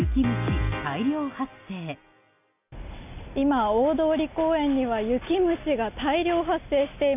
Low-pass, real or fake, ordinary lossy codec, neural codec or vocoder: 3.6 kHz; real; none; none